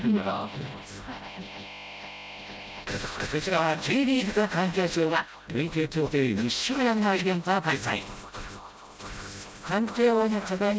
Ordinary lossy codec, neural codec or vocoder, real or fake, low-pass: none; codec, 16 kHz, 0.5 kbps, FreqCodec, smaller model; fake; none